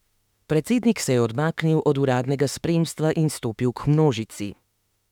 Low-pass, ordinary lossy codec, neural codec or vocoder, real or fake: 19.8 kHz; none; autoencoder, 48 kHz, 32 numbers a frame, DAC-VAE, trained on Japanese speech; fake